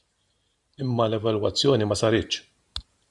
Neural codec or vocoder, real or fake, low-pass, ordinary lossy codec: vocoder, 44.1 kHz, 128 mel bands every 512 samples, BigVGAN v2; fake; 10.8 kHz; Opus, 64 kbps